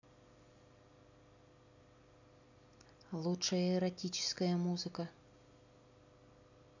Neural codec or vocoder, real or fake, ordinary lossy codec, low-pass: none; real; none; 7.2 kHz